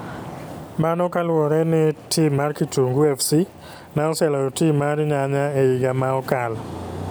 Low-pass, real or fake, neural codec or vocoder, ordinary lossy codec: none; real; none; none